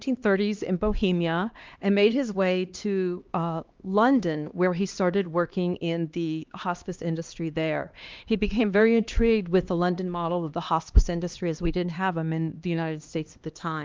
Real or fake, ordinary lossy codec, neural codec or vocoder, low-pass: fake; Opus, 32 kbps; codec, 16 kHz, 2 kbps, X-Codec, HuBERT features, trained on LibriSpeech; 7.2 kHz